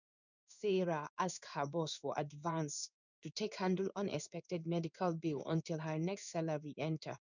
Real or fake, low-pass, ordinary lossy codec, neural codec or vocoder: fake; 7.2 kHz; none; codec, 16 kHz in and 24 kHz out, 1 kbps, XY-Tokenizer